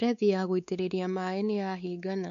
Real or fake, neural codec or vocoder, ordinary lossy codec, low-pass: fake; codec, 16 kHz, 4 kbps, X-Codec, WavLM features, trained on Multilingual LibriSpeech; none; 7.2 kHz